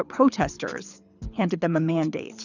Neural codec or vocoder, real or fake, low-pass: codec, 24 kHz, 6 kbps, HILCodec; fake; 7.2 kHz